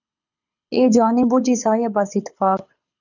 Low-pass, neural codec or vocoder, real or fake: 7.2 kHz; codec, 24 kHz, 6 kbps, HILCodec; fake